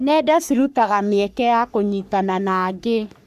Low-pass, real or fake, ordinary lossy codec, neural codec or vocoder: 14.4 kHz; fake; none; codec, 44.1 kHz, 3.4 kbps, Pupu-Codec